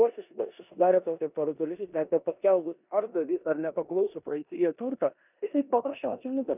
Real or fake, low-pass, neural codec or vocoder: fake; 3.6 kHz; codec, 16 kHz in and 24 kHz out, 0.9 kbps, LongCat-Audio-Codec, four codebook decoder